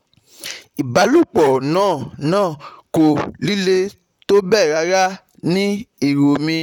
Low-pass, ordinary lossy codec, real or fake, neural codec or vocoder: 19.8 kHz; none; fake; vocoder, 44.1 kHz, 128 mel bands, Pupu-Vocoder